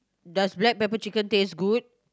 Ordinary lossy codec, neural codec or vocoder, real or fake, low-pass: none; none; real; none